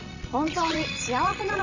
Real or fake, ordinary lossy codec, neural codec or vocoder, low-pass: fake; none; vocoder, 22.05 kHz, 80 mel bands, Vocos; 7.2 kHz